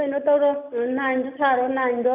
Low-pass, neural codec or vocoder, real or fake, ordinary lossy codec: 3.6 kHz; none; real; none